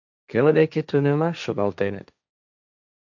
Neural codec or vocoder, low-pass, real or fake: codec, 16 kHz, 1.1 kbps, Voila-Tokenizer; 7.2 kHz; fake